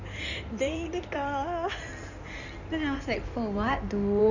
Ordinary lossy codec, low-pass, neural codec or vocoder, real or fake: none; 7.2 kHz; codec, 16 kHz in and 24 kHz out, 2.2 kbps, FireRedTTS-2 codec; fake